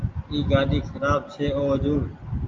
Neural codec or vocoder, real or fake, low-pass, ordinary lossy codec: none; real; 7.2 kHz; Opus, 24 kbps